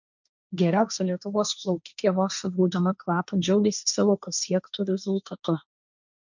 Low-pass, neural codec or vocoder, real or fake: 7.2 kHz; codec, 16 kHz, 1.1 kbps, Voila-Tokenizer; fake